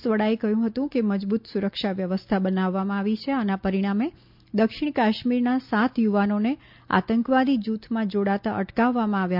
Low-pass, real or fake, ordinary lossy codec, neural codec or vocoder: 5.4 kHz; real; MP3, 48 kbps; none